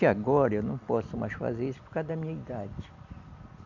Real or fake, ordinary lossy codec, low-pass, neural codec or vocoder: real; none; 7.2 kHz; none